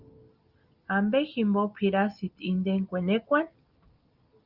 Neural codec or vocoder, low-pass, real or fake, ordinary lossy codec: none; 5.4 kHz; real; Opus, 24 kbps